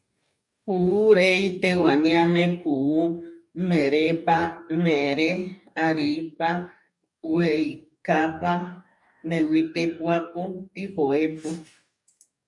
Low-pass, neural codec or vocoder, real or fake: 10.8 kHz; codec, 44.1 kHz, 2.6 kbps, DAC; fake